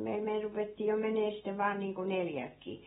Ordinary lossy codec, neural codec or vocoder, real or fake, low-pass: AAC, 16 kbps; none; real; 14.4 kHz